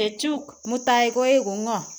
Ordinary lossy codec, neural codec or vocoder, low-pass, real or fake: none; none; none; real